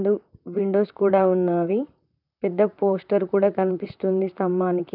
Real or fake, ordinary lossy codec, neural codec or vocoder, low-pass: fake; none; vocoder, 44.1 kHz, 128 mel bands, Pupu-Vocoder; 5.4 kHz